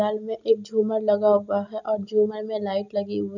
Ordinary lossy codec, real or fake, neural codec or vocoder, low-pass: none; real; none; 7.2 kHz